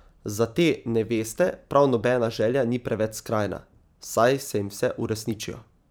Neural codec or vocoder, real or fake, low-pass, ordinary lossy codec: vocoder, 44.1 kHz, 128 mel bands every 512 samples, BigVGAN v2; fake; none; none